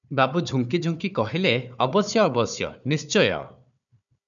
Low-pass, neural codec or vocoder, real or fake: 7.2 kHz; codec, 16 kHz, 4 kbps, FunCodec, trained on Chinese and English, 50 frames a second; fake